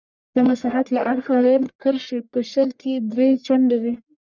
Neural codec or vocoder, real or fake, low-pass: codec, 44.1 kHz, 1.7 kbps, Pupu-Codec; fake; 7.2 kHz